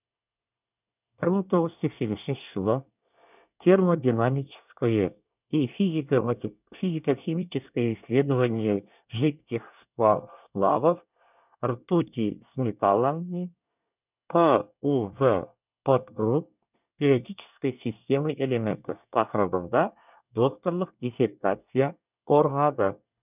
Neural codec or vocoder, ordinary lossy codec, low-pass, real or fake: codec, 24 kHz, 1 kbps, SNAC; none; 3.6 kHz; fake